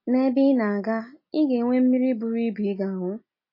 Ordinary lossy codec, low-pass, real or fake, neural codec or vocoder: MP3, 24 kbps; 5.4 kHz; real; none